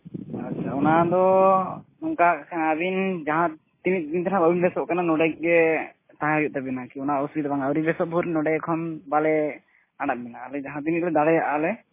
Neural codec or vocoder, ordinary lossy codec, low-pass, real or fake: none; MP3, 16 kbps; 3.6 kHz; real